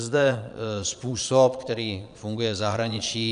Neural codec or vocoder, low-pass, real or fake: vocoder, 22.05 kHz, 80 mel bands, Vocos; 9.9 kHz; fake